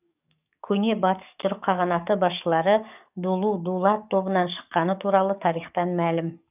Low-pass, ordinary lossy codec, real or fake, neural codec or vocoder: 3.6 kHz; none; fake; codec, 44.1 kHz, 7.8 kbps, DAC